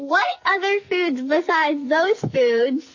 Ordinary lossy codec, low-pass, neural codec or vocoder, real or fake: MP3, 32 kbps; 7.2 kHz; codec, 44.1 kHz, 2.6 kbps, SNAC; fake